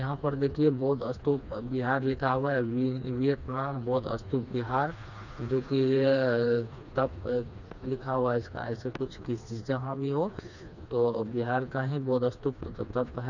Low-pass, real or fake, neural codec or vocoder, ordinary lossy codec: 7.2 kHz; fake; codec, 16 kHz, 2 kbps, FreqCodec, smaller model; none